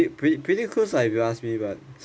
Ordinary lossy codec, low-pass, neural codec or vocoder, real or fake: none; none; none; real